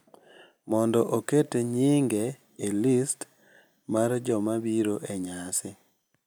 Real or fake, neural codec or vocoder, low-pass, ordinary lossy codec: real; none; none; none